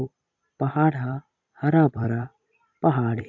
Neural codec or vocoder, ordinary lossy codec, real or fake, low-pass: vocoder, 44.1 kHz, 128 mel bands every 256 samples, BigVGAN v2; AAC, 48 kbps; fake; 7.2 kHz